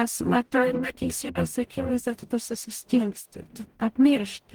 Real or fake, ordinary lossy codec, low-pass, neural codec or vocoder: fake; Opus, 24 kbps; 19.8 kHz; codec, 44.1 kHz, 0.9 kbps, DAC